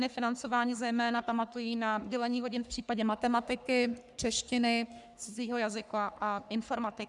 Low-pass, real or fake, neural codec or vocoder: 10.8 kHz; fake; codec, 44.1 kHz, 3.4 kbps, Pupu-Codec